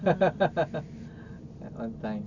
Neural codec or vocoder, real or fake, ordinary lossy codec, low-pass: none; real; none; 7.2 kHz